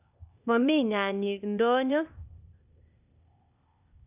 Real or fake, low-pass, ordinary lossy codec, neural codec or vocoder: fake; 3.6 kHz; none; codec, 16 kHz, 0.8 kbps, ZipCodec